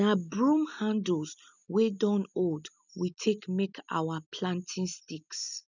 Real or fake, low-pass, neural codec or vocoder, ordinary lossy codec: real; 7.2 kHz; none; none